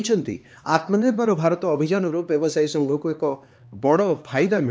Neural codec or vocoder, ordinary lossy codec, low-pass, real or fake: codec, 16 kHz, 2 kbps, X-Codec, HuBERT features, trained on LibriSpeech; none; none; fake